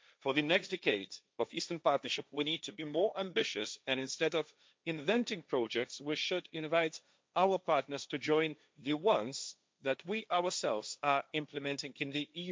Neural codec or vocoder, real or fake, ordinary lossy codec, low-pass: codec, 16 kHz, 1.1 kbps, Voila-Tokenizer; fake; none; none